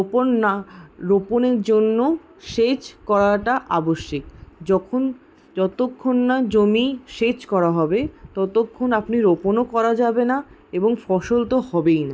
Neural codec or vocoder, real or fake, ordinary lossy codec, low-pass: none; real; none; none